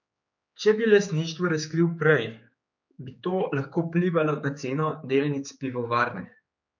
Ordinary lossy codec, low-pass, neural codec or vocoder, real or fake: MP3, 64 kbps; 7.2 kHz; codec, 16 kHz, 4 kbps, X-Codec, HuBERT features, trained on general audio; fake